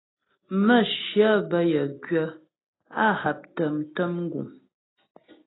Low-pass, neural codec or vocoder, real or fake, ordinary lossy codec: 7.2 kHz; none; real; AAC, 16 kbps